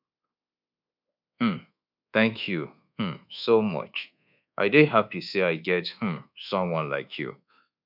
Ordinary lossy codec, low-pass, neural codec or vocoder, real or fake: none; 5.4 kHz; codec, 24 kHz, 1.2 kbps, DualCodec; fake